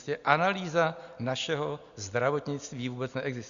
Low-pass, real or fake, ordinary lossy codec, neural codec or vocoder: 7.2 kHz; real; Opus, 64 kbps; none